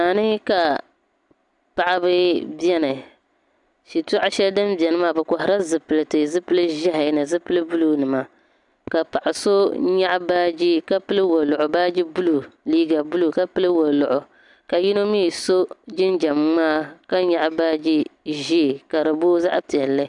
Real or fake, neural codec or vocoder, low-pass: real; none; 10.8 kHz